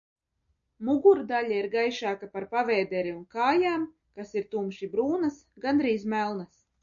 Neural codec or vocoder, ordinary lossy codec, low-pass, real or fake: none; MP3, 96 kbps; 7.2 kHz; real